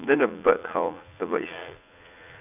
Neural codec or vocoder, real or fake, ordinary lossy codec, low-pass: vocoder, 44.1 kHz, 80 mel bands, Vocos; fake; none; 3.6 kHz